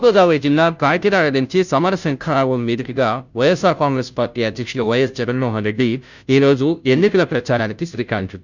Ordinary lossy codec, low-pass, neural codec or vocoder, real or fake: none; 7.2 kHz; codec, 16 kHz, 0.5 kbps, FunCodec, trained on Chinese and English, 25 frames a second; fake